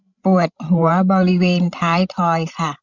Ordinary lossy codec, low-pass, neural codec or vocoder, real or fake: none; 7.2 kHz; codec, 16 kHz, 16 kbps, FreqCodec, larger model; fake